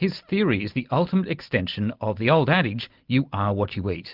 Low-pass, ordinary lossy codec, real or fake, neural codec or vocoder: 5.4 kHz; Opus, 24 kbps; real; none